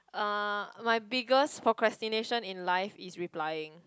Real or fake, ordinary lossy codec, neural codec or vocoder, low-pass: real; none; none; none